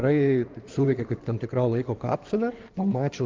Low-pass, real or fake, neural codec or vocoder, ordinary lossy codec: 7.2 kHz; fake; codec, 16 kHz in and 24 kHz out, 2.2 kbps, FireRedTTS-2 codec; Opus, 32 kbps